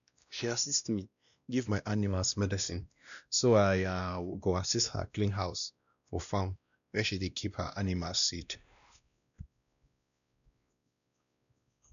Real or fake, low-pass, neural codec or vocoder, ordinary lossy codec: fake; 7.2 kHz; codec, 16 kHz, 1 kbps, X-Codec, WavLM features, trained on Multilingual LibriSpeech; none